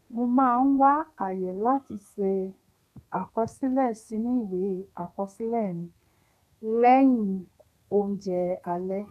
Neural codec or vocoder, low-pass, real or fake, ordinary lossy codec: codec, 32 kHz, 1.9 kbps, SNAC; 14.4 kHz; fake; none